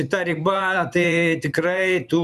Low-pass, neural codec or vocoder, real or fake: 14.4 kHz; vocoder, 44.1 kHz, 128 mel bands every 512 samples, BigVGAN v2; fake